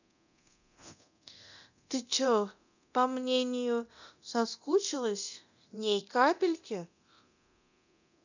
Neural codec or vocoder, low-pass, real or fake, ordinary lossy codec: codec, 24 kHz, 0.9 kbps, DualCodec; 7.2 kHz; fake; none